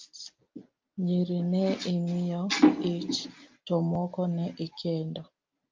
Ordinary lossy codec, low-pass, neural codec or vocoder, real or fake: Opus, 24 kbps; 7.2 kHz; none; real